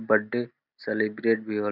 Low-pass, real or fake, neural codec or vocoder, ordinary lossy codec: 5.4 kHz; real; none; none